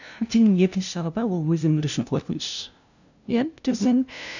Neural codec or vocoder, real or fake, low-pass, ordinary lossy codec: codec, 16 kHz, 0.5 kbps, FunCodec, trained on LibriTTS, 25 frames a second; fake; 7.2 kHz; none